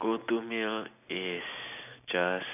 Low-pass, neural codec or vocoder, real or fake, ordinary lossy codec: 3.6 kHz; none; real; none